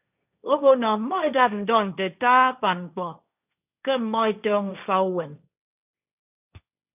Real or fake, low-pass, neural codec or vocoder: fake; 3.6 kHz; codec, 16 kHz, 1.1 kbps, Voila-Tokenizer